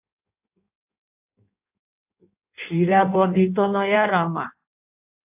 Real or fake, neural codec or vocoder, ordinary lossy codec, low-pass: fake; codec, 16 kHz in and 24 kHz out, 1.1 kbps, FireRedTTS-2 codec; AAC, 32 kbps; 3.6 kHz